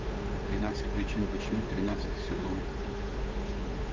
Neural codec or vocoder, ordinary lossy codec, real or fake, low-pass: codec, 16 kHz, 8 kbps, FunCodec, trained on Chinese and English, 25 frames a second; Opus, 24 kbps; fake; 7.2 kHz